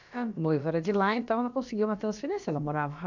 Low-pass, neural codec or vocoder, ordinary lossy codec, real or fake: 7.2 kHz; codec, 16 kHz, about 1 kbps, DyCAST, with the encoder's durations; none; fake